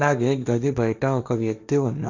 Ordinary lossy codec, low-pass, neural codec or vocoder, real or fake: none; 7.2 kHz; codec, 16 kHz, 1.1 kbps, Voila-Tokenizer; fake